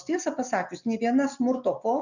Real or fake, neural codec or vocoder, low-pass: real; none; 7.2 kHz